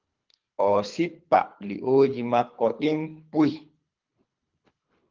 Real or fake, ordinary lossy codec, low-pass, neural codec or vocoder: fake; Opus, 24 kbps; 7.2 kHz; codec, 24 kHz, 3 kbps, HILCodec